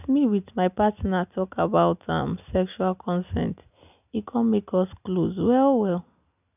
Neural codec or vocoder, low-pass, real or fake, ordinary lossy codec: none; 3.6 kHz; real; none